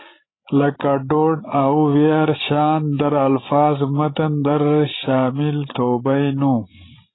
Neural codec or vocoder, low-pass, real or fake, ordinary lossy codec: codec, 16 kHz, 16 kbps, FreqCodec, larger model; 7.2 kHz; fake; AAC, 16 kbps